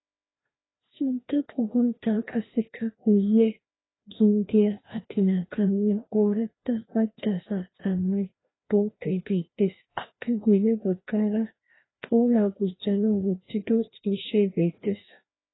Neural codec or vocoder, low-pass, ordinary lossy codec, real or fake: codec, 16 kHz, 1 kbps, FreqCodec, larger model; 7.2 kHz; AAC, 16 kbps; fake